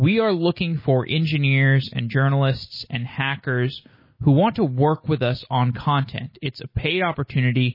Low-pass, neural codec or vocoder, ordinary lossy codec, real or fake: 5.4 kHz; none; MP3, 24 kbps; real